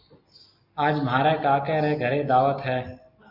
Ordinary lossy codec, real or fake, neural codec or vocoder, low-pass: MP3, 48 kbps; real; none; 5.4 kHz